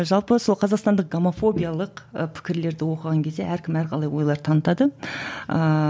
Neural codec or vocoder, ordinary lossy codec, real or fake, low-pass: none; none; real; none